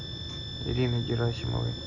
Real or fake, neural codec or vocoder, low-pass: real; none; 7.2 kHz